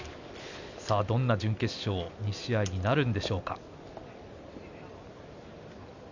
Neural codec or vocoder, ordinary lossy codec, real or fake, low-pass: none; none; real; 7.2 kHz